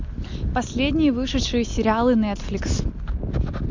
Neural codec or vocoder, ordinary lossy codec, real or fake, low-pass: none; MP3, 64 kbps; real; 7.2 kHz